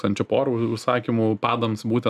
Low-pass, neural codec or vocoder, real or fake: 14.4 kHz; none; real